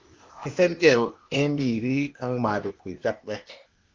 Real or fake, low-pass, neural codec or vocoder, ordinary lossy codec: fake; 7.2 kHz; codec, 16 kHz, 0.8 kbps, ZipCodec; Opus, 32 kbps